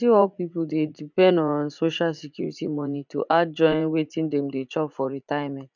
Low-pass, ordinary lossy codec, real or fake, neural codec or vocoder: 7.2 kHz; none; fake; vocoder, 44.1 kHz, 80 mel bands, Vocos